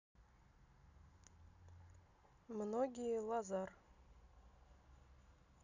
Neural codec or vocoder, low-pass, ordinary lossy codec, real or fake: none; 7.2 kHz; none; real